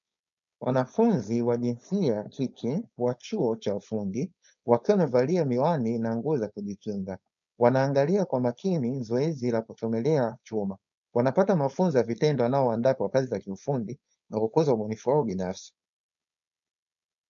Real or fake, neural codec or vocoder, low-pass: fake; codec, 16 kHz, 4.8 kbps, FACodec; 7.2 kHz